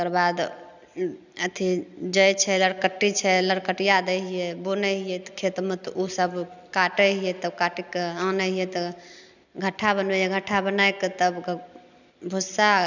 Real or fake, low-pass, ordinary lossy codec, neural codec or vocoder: real; 7.2 kHz; none; none